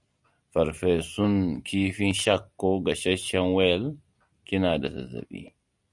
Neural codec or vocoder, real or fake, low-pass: none; real; 10.8 kHz